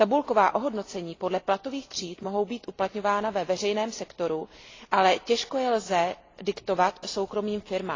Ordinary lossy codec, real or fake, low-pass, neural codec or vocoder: AAC, 32 kbps; real; 7.2 kHz; none